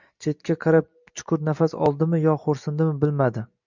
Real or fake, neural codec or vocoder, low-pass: real; none; 7.2 kHz